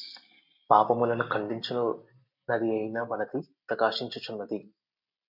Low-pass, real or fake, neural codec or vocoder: 5.4 kHz; real; none